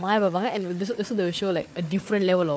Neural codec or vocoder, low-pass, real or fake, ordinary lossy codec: codec, 16 kHz, 4 kbps, FunCodec, trained on Chinese and English, 50 frames a second; none; fake; none